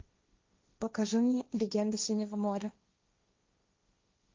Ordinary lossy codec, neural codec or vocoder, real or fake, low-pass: Opus, 32 kbps; codec, 16 kHz, 1.1 kbps, Voila-Tokenizer; fake; 7.2 kHz